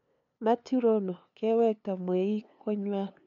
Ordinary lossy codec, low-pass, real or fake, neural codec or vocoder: MP3, 96 kbps; 7.2 kHz; fake; codec, 16 kHz, 2 kbps, FunCodec, trained on LibriTTS, 25 frames a second